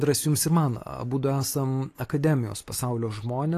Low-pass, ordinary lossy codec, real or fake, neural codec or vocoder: 14.4 kHz; AAC, 48 kbps; real; none